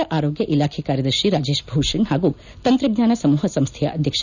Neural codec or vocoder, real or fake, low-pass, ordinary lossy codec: none; real; 7.2 kHz; none